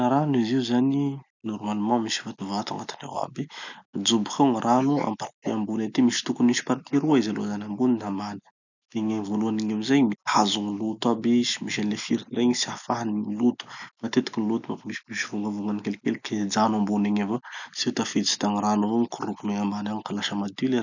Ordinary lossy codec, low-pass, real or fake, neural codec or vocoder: none; 7.2 kHz; real; none